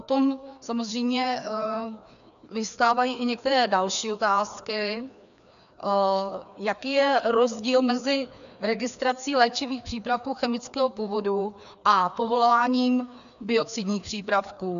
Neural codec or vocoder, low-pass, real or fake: codec, 16 kHz, 2 kbps, FreqCodec, larger model; 7.2 kHz; fake